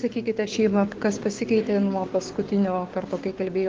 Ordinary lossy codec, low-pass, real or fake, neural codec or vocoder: Opus, 16 kbps; 7.2 kHz; fake; codec, 16 kHz, 6 kbps, DAC